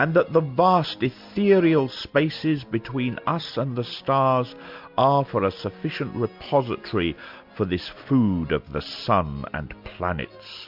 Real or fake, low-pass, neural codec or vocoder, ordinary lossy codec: real; 5.4 kHz; none; MP3, 48 kbps